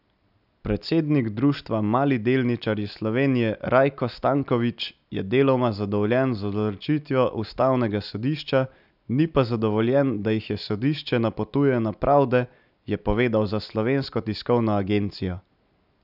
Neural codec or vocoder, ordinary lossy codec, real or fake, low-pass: none; none; real; 5.4 kHz